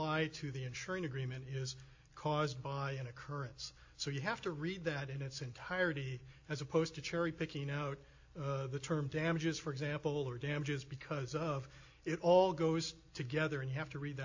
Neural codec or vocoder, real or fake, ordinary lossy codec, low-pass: none; real; AAC, 48 kbps; 7.2 kHz